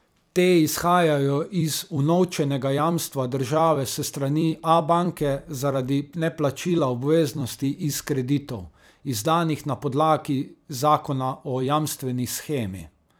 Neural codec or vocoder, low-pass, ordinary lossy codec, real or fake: vocoder, 44.1 kHz, 128 mel bands every 256 samples, BigVGAN v2; none; none; fake